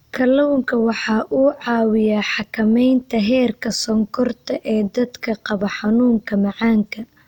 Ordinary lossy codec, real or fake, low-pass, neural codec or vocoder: none; real; 19.8 kHz; none